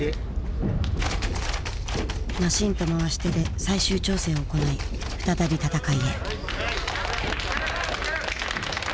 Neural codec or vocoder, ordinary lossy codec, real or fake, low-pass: none; none; real; none